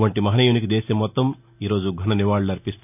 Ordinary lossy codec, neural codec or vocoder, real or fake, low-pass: none; none; real; 3.6 kHz